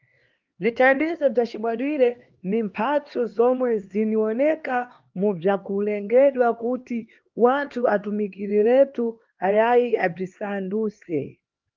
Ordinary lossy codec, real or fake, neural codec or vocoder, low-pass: Opus, 32 kbps; fake; codec, 16 kHz, 2 kbps, X-Codec, HuBERT features, trained on LibriSpeech; 7.2 kHz